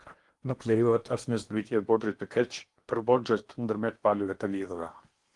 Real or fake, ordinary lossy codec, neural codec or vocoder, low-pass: fake; Opus, 24 kbps; codec, 16 kHz in and 24 kHz out, 0.8 kbps, FocalCodec, streaming, 65536 codes; 10.8 kHz